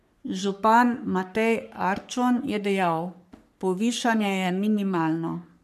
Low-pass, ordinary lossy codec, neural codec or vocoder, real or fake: 14.4 kHz; MP3, 96 kbps; codec, 44.1 kHz, 3.4 kbps, Pupu-Codec; fake